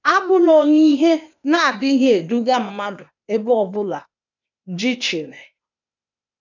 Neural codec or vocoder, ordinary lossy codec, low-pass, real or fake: codec, 16 kHz, 0.8 kbps, ZipCodec; none; 7.2 kHz; fake